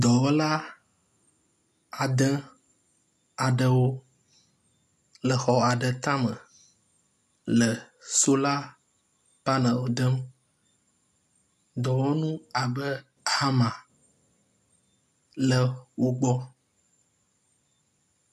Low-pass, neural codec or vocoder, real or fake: 14.4 kHz; none; real